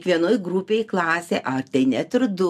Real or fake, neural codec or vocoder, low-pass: real; none; 14.4 kHz